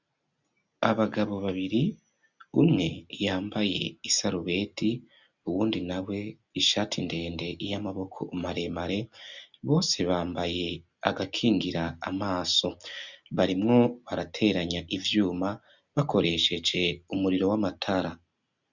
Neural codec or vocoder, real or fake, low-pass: none; real; 7.2 kHz